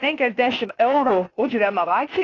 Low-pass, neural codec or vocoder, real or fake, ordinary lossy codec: 7.2 kHz; codec, 16 kHz, 0.8 kbps, ZipCodec; fake; AAC, 32 kbps